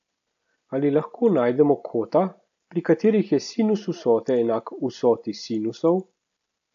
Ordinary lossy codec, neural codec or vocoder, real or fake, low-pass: AAC, 64 kbps; none; real; 7.2 kHz